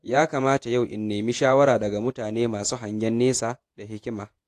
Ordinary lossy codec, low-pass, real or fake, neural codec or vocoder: AAC, 64 kbps; 14.4 kHz; fake; vocoder, 48 kHz, 128 mel bands, Vocos